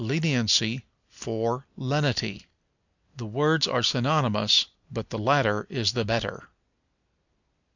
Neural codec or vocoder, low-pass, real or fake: none; 7.2 kHz; real